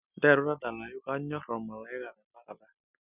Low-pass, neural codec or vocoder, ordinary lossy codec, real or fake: 3.6 kHz; none; none; real